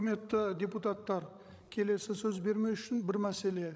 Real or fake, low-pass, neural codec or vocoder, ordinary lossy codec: fake; none; codec, 16 kHz, 16 kbps, FreqCodec, larger model; none